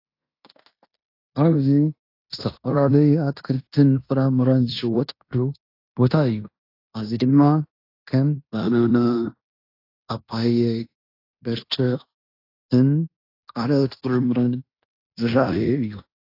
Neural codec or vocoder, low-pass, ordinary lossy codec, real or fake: codec, 16 kHz in and 24 kHz out, 0.9 kbps, LongCat-Audio-Codec, fine tuned four codebook decoder; 5.4 kHz; AAC, 32 kbps; fake